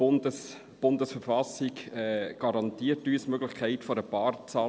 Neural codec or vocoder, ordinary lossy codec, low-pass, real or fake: none; none; none; real